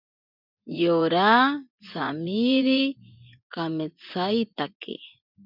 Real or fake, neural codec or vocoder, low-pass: fake; vocoder, 24 kHz, 100 mel bands, Vocos; 5.4 kHz